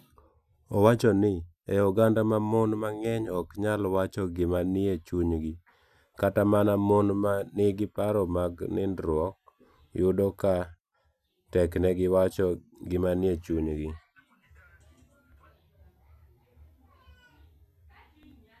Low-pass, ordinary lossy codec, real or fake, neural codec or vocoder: 14.4 kHz; none; real; none